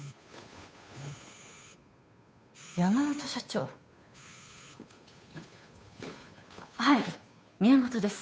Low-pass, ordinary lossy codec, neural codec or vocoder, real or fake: none; none; codec, 16 kHz, 2 kbps, FunCodec, trained on Chinese and English, 25 frames a second; fake